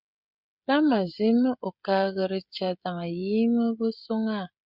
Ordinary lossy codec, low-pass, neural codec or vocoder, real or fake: Opus, 64 kbps; 5.4 kHz; codec, 16 kHz, 16 kbps, FreqCodec, smaller model; fake